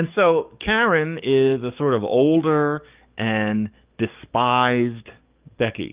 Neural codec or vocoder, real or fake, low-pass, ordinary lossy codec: codec, 44.1 kHz, 7.8 kbps, DAC; fake; 3.6 kHz; Opus, 24 kbps